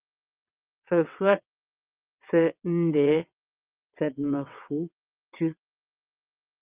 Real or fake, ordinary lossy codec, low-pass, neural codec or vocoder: fake; Opus, 24 kbps; 3.6 kHz; vocoder, 22.05 kHz, 80 mel bands, WaveNeXt